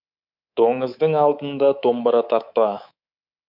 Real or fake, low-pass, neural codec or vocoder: fake; 5.4 kHz; codec, 24 kHz, 3.1 kbps, DualCodec